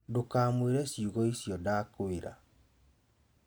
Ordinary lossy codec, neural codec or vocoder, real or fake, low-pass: none; none; real; none